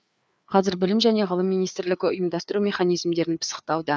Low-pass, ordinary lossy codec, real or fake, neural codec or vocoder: none; none; fake; codec, 16 kHz, 4 kbps, FreqCodec, larger model